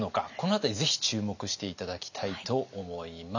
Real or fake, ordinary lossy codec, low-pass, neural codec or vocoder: real; none; 7.2 kHz; none